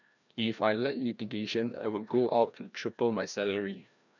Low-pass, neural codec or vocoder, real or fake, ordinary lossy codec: 7.2 kHz; codec, 16 kHz, 1 kbps, FreqCodec, larger model; fake; none